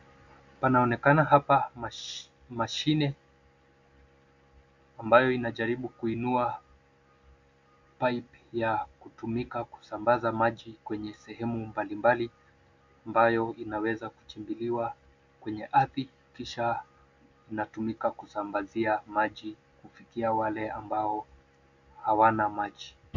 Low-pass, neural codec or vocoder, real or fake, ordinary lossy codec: 7.2 kHz; none; real; MP3, 64 kbps